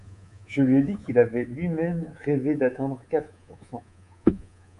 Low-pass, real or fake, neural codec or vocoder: 10.8 kHz; fake; codec, 24 kHz, 3.1 kbps, DualCodec